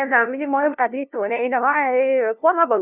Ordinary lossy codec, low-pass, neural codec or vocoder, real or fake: none; 3.6 kHz; codec, 16 kHz, 0.5 kbps, FunCodec, trained on LibriTTS, 25 frames a second; fake